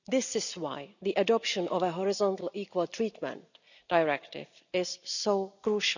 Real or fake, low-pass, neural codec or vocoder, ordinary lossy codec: real; 7.2 kHz; none; none